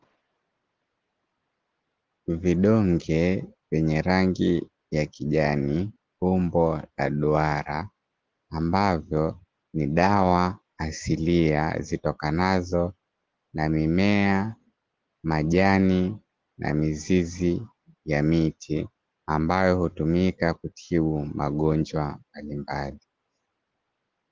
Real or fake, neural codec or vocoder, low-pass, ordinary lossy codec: real; none; 7.2 kHz; Opus, 16 kbps